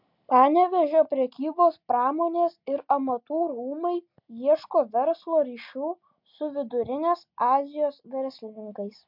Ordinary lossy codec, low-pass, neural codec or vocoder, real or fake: MP3, 48 kbps; 5.4 kHz; none; real